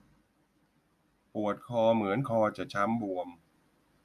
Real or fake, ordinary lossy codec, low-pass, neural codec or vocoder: real; none; 14.4 kHz; none